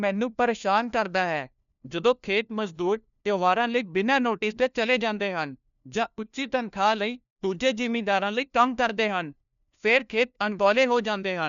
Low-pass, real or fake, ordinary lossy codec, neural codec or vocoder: 7.2 kHz; fake; none; codec, 16 kHz, 1 kbps, FunCodec, trained on LibriTTS, 50 frames a second